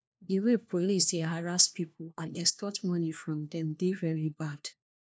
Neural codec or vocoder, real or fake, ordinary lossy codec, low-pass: codec, 16 kHz, 1 kbps, FunCodec, trained on LibriTTS, 50 frames a second; fake; none; none